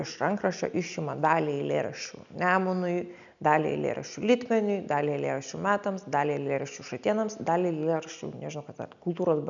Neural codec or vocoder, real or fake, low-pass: none; real; 7.2 kHz